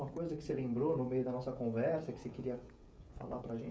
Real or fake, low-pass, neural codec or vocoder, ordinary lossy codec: fake; none; codec, 16 kHz, 16 kbps, FreqCodec, smaller model; none